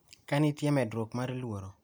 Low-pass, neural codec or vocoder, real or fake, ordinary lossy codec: none; none; real; none